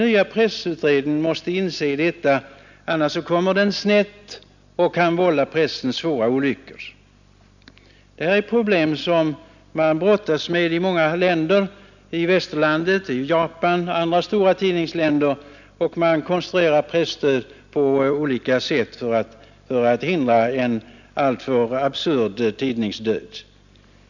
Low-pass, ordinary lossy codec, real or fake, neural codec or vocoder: 7.2 kHz; none; real; none